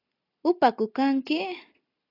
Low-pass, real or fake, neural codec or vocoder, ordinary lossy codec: 5.4 kHz; real; none; AAC, 24 kbps